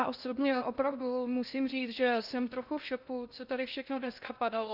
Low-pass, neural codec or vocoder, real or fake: 5.4 kHz; codec, 16 kHz in and 24 kHz out, 0.6 kbps, FocalCodec, streaming, 2048 codes; fake